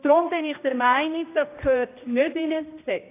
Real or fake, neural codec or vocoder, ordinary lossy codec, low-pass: fake; codec, 16 kHz, 1 kbps, X-Codec, HuBERT features, trained on general audio; AAC, 24 kbps; 3.6 kHz